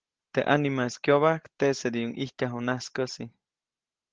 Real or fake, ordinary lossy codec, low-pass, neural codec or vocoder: real; Opus, 16 kbps; 7.2 kHz; none